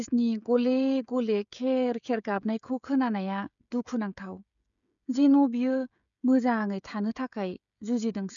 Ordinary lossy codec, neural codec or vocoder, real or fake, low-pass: none; codec, 16 kHz, 16 kbps, FreqCodec, smaller model; fake; 7.2 kHz